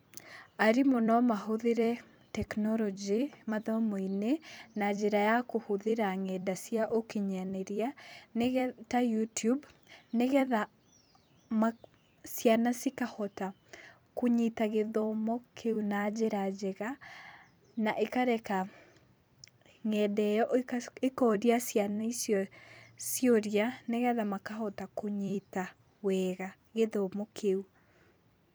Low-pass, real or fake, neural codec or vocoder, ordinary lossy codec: none; fake; vocoder, 44.1 kHz, 128 mel bands every 256 samples, BigVGAN v2; none